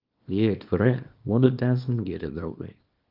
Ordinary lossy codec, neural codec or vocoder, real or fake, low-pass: Opus, 24 kbps; codec, 24 kHz, 0.9 kbps, WavTokenizer, small release; fake; 5.4 kHz